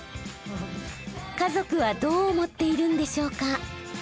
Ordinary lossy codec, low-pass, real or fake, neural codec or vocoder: none; none; real; none